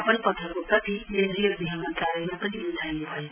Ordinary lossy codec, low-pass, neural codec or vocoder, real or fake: none; 3.6 kHz; none; real